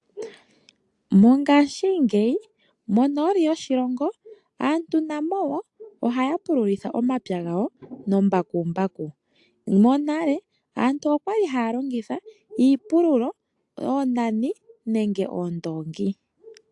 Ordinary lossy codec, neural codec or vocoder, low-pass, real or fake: AAC, 64 kbps; none; 10.8 kHz; real